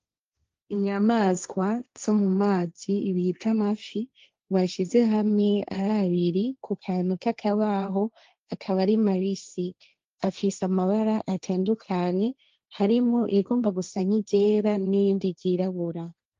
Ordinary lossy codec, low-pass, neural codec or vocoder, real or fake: Opus, 32 kbps; 7.2 kHz; codec, 16 kHz, 1.1 kbps, Voila-Tokenizer; fake